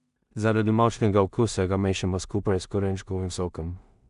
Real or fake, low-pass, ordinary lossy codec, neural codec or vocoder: fake; 10.8 kHz; none; codec, 16 kHz in and 24 kHz out, 0.4 kbps, LongCat-Audio-Codec, two codebook decoder